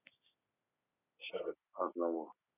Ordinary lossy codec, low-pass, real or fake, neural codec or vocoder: none; 3.6 kHz; real; none